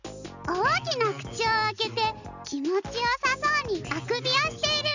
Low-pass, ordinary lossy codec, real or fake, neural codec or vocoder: 7.2 kHz; none; real; none